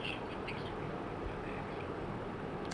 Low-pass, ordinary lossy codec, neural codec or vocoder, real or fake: 9.9 kHz; none; vocoder, 44.1 kHz, 128 mel bands, Pupu-Vocoder; fake